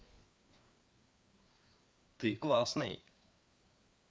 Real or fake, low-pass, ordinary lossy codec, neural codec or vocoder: fake; none; none; codec, 16 kHz, 4 kbps, FreqCodec, larger model